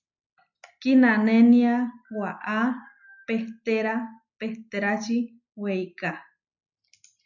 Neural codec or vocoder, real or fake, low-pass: none; real; 7.2 kHz